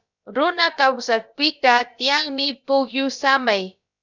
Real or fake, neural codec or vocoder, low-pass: fake; codec, 16 kHz, about 1 kbps, DyCAST, with the encoder's durations; 7.2 kHz